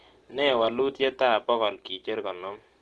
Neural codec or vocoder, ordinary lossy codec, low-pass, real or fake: none; Opus, 16 kbps; 10.8 kHz; real